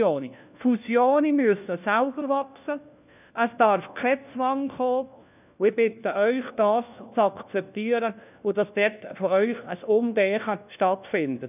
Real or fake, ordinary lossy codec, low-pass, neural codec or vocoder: fake; none; 3.6 kHz; codec, 16 kHz, 1 kbps, FunCodec, trained on LibriTTS, 50 frames a second